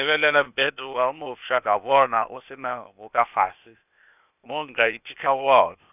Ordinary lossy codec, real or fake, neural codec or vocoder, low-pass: none; fake; codec, 16 kHz, 0.8 kbps, ZipCodec; 3.6 kHz